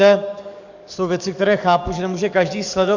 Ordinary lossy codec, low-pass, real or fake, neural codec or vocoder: Opus, 64 kbps; 7.2 kHz; fake; codec, 44.1 kHz, 7.8 kbps, DAC